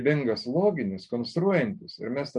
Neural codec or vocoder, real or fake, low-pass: none; real; 10.8 kHz